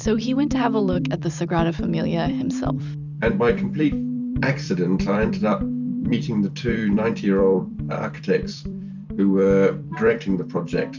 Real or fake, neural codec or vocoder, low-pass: fake; vocoder, 44.1 kHz, 128 mel bands every 256 samples, BigVGAN v2; 7.2 kHz